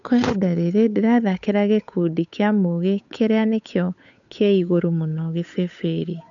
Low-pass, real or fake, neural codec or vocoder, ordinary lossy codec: 7.2 kHz; fake; codec, 16 kHz, 8 kbps, FunCodec, trained on Chinese and English, 25 frames a second; none